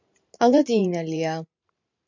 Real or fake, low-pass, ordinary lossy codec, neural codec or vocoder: fake; 7.2 kHz; MP3, 64 kbps; vocoder, 44.1 kHz, 128 mel bands every 512 samples, BigVGAN v2